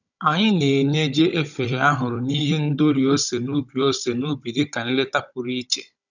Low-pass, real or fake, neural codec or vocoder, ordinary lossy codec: 7.2 kHz; fake; codec, 16 kHz, 16 kbps, FunCodec, trained on Chinese and English, 50 frames a second; none